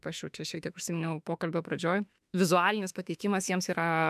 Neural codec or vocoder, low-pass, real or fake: autoencoder, 48 kHz, 32 numbers a frame, DAC-VAE, trained on Japanese speech; 14.4 kHz; fake